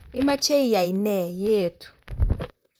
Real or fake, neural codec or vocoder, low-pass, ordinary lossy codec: fake; vocoder, 44.1 kHz, 128 mel bands, Pupu-Vocoder; none; none